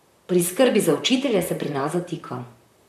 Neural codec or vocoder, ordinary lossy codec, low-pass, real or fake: vocoder, 44.1 kHz, 128 mel bands, Pupu-Vocoder; none; 14.4 kHz; fake